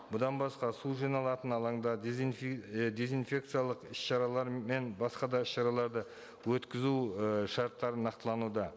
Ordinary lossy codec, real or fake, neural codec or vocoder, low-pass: none; real; none; none